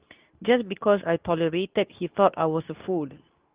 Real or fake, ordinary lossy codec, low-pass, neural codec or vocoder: fake; Opus, 32 kbps; 3.6 kHz; codec, 24 kHz, 0.9 kbps, WavTokenizer, medium speech release version 2